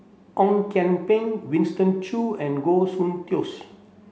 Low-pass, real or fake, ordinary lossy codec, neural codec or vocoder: none; real; none; none